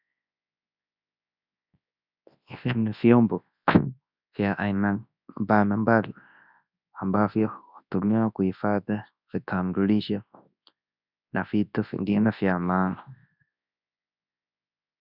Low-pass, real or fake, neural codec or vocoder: 5.4 kHz; fake; codec, 24 kHz, 0.9 kbps, WavTokenizer, large speech release